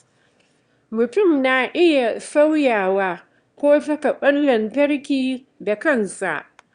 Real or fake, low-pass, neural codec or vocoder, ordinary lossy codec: fake; 9.9 kHz; autoencoder, 22.05 kHz, a latent of 192 numbers a frame, VITS, trained on one speaker; Opus, 64 kbps